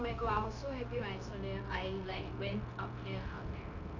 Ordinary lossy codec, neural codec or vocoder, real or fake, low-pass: none; codec, 16 kHz, 0.9 kbps, LongCat-Audio-Codec; fake; 7.2 kHz